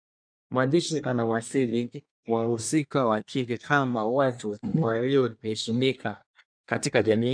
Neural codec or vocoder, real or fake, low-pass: codec, 24 kHz, 1 kbps, SNAC; fake; 9.9 kHz